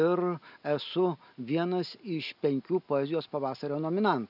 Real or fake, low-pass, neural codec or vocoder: real; 5.4 kHz; none